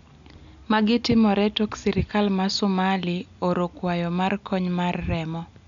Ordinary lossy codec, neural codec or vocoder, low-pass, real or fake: none; none; 7.2 kHz; real